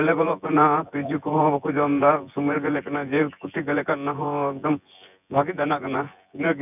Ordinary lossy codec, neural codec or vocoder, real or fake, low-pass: none; vocoder, 24 kHz, 100 mel bands, Vocos; fake; 3.6 kHz